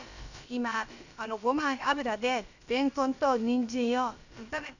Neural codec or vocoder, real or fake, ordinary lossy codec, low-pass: codec, 16 kHz, about 1 kbps, DyCAST, with the encoder's durations; fake; none; 7.2 kHz